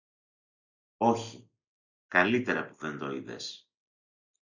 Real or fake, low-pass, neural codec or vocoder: real; 7.2 kHz; none